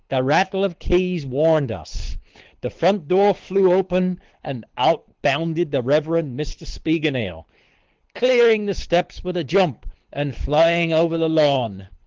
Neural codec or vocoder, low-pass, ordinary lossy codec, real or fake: codec, 24 kHz, 6 kbps, HILCodec; 7.2 kHz; Opus, 16 kbps; fake